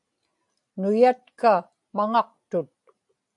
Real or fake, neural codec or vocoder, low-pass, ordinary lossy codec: real; none; 10.8 kHz; AAC, 64 kbps